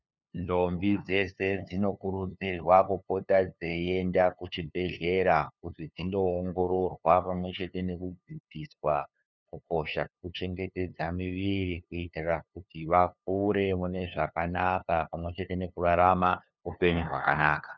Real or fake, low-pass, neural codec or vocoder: fake; 7.2 kHz; codec, 16 kHz, 2 kbps, FunCodec, trained on LibriTTS, 25 frames a second